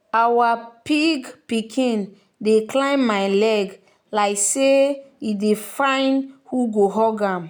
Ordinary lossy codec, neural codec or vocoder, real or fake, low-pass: none; none; real; none